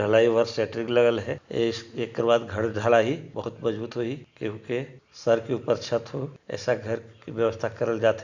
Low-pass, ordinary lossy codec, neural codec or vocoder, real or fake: 7.2 kHz; Opus, 64 kbps; none; real